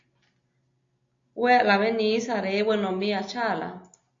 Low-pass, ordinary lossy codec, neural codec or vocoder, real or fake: 7.2 kHz; AAC, 48 kbps; none; real